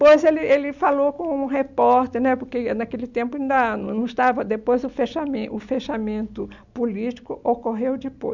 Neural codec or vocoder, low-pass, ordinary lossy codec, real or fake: none; 7.2 kHz; none; real